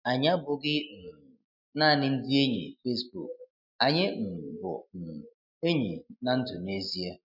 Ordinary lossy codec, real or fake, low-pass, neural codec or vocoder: none; real; 5.4 kHz; none